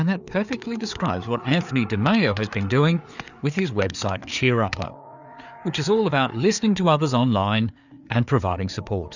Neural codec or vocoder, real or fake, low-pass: codec, 16 kHz, 4 kbps, FreqCodec, larger model; fake; 7.2 kHz